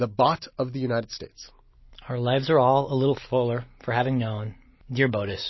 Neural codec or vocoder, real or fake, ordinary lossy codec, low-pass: none; real; MP3, 24 kbps; 7.2 kHz